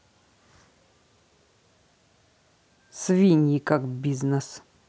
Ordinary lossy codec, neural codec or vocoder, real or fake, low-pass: none; none; real; none